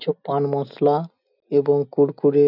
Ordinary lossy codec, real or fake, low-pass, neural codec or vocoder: none; real; 5.4 kHz; none